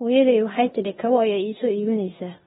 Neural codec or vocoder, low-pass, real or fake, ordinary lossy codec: codec, 16 kHz in and 24 kHz out, 0.9 kbps, LongCat-Audio-Codec, four codebook decoder; 10.8 kHz; fake; AAC, 16 kbps